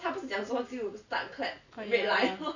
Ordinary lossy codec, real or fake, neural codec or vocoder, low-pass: none; real; none; 7.2 kHz